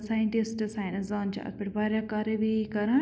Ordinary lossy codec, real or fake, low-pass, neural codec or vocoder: none; real; none; none